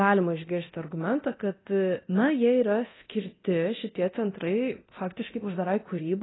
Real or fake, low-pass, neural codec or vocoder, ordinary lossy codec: fake; 7.2 kHz; codec, 24 kHz, 0.9 kbps, DualCodec; AAC, 16 kbps